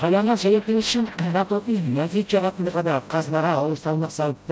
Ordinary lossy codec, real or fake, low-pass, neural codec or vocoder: none; fake; none; codec, 16 kHz, 0.5 kbps, FreqCodec, smaller model